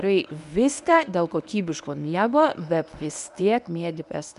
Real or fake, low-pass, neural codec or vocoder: fake; 10.8 kHz; codec, 24 kHz, 0.9 kbps, WavTokenizer, medium speech release version 1